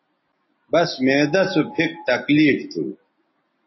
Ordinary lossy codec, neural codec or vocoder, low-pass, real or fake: MP3, 24 kbps; none; 7.2 kHz; real